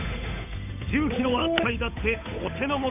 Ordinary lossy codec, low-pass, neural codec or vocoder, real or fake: none; 3.6 kHz; codec, 16 kHz, 8 kbps, FunCodec, trained on Chinese and English, 25 frames a second; fake